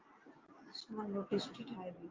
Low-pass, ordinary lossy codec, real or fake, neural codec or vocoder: 7.2 kHz; Opus, 32 kbps; real; none